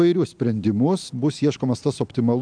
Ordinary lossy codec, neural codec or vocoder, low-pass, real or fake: MP3, 96 kbps; none; 9.9 kHz; real